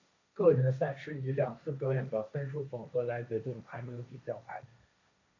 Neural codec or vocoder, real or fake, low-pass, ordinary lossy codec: codec, 16 kHz, 1.1 kbps, Voila-Tokenizer; fake; 7.2 kHz; AAC, 48 kbps